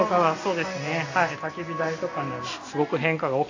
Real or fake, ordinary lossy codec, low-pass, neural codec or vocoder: real; AAC, 48 kbps; 7.2 kHz; none